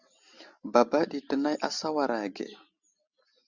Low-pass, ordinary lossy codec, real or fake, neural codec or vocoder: 7.2 kHz; Opus, 64 kbps; real; none